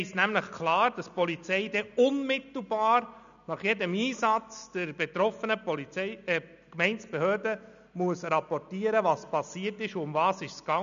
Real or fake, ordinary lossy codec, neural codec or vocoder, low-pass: real; none; none; 7.2 kHz